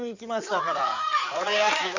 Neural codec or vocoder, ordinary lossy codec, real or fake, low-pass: codec, 16 kHz in and 24 kHz out, 2.2 kbps, FireRedTTS-2 codec; none; fake; 7.2 kHz